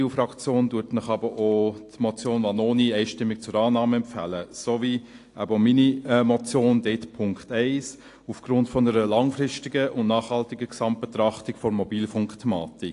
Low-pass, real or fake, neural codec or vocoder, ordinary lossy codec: 10.8 kHz; real; none; AAC, 48 kbps